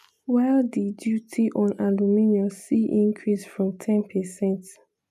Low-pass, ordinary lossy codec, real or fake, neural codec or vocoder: 14.4 kHz; none; real; none